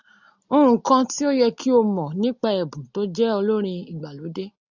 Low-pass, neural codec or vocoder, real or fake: 7.2 kHz; none; real